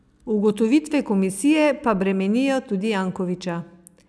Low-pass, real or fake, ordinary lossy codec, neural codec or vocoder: none; real; none; none